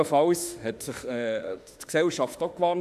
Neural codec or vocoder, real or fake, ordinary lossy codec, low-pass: autoencoder, 48 kHz, 32 numbers a frame, DAC-VAE, trained on Japanese speech; fake; AAC, 96 kbps; 14.4 kHz